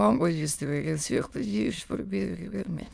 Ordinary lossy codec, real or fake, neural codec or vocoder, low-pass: none; fake; autoencoder, 22.05 kHz, a latent of 192 numbers a frame, VITS, trained on many speakers; none